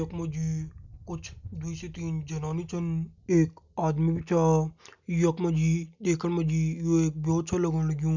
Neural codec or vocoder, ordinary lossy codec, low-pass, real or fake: none; none; 7.2 kHz; real